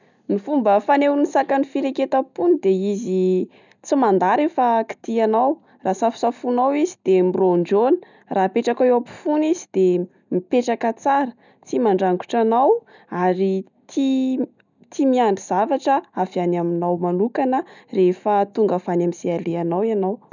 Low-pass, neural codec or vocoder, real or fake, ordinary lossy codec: 7.2 kHz; none; real; none